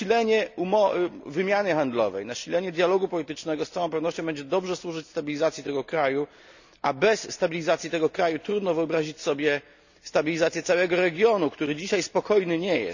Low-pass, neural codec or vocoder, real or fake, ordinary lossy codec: 7.2 kHz; none; real; none